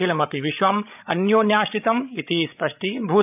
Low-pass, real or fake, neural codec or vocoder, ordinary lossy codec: 3.6 kHz; fake; codec, 16 kHz, 16 kbps, FreqCodec, larger model; none